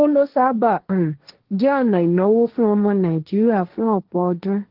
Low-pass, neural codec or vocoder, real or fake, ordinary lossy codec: 5.4 kHz; codec, 16 kHz, 1.1 kbps, Voila-Tokenizer; fake; Opus, 16 kbps